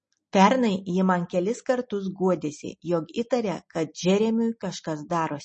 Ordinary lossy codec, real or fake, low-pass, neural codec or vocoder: MP3, 32 kbps; real; 10.8 kHz; none